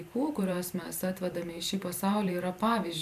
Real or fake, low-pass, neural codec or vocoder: fake; 14.4 kHz; vocoder, 44.1 kHz, 128 mel bands every 512 samples, BigVGAN v2